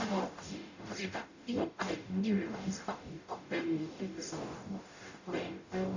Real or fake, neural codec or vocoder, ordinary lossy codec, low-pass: fake; codec, 44.1 kHz, 0.9 kbps, DAC; none; 7.2 kHz